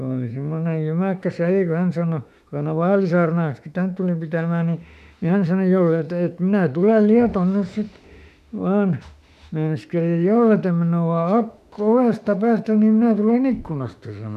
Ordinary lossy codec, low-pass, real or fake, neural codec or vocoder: none; 14.4 kHz; fake; autoencoder, 48 kHz, 32 numbers a frame, DAC-VAE, trained on Japanese speech